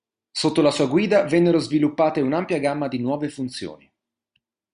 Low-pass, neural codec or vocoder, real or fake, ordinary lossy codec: 9.9 kHz; none; real; Opus, 64 kbps